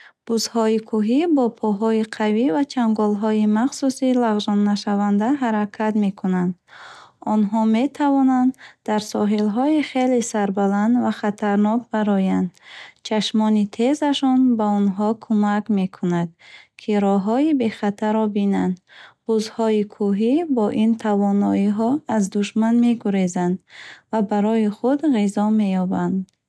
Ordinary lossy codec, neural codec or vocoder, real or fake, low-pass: none; none; real; none